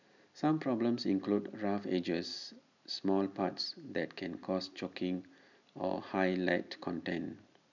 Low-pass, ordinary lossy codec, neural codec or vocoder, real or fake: 7.2 kHz; none; none; real